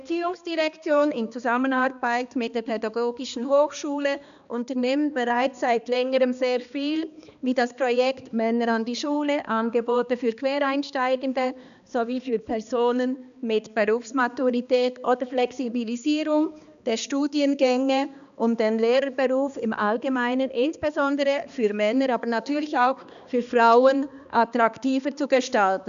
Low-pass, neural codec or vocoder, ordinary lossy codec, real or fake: 7.2 kHz; codec, 16 kHz, 2 kbps, X-Codec, HuBERT features, trained on balanced general audio; none; fake